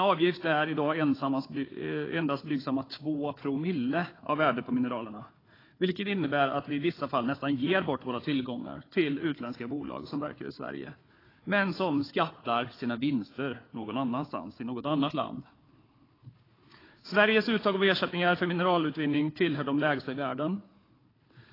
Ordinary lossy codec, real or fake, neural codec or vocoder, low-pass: AAC, 24 kbps; fake; codec, 16 kHz, 4 kbps, FunCodec, trained on Chinese and English, 50 frames a second; 5.4 kHz